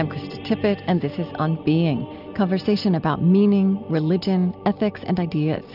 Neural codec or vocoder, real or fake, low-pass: none; real; 5.4 kHz